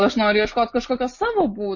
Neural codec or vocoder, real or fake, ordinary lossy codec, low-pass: none; real; MP3, 32 kbps; 7.2 kHz